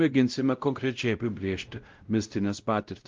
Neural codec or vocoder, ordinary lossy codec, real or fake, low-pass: codec, 16 kHz, 0.5 kbps, X-Codec, WavLM features, trained on Multilingual LibriSpeech; Opus, 32 kbps; fake; 7.2 kHz